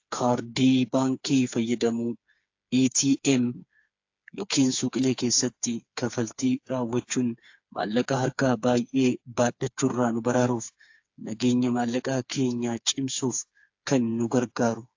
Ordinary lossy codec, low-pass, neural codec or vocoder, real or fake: AAC, 48 kbps; 7.2 kHz; codec, 16 kHz, 4 kbps, FreqCodec, smaller model; fake